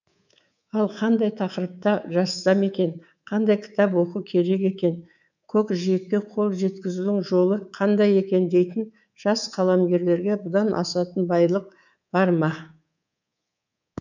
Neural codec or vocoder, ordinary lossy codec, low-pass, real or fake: codec, 24 kHz, 3.1 kbps, DualCodec; none; 7.2 kHz; fake